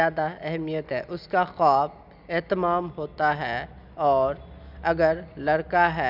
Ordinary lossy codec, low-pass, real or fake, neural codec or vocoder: none; 5.4 kHz; real; none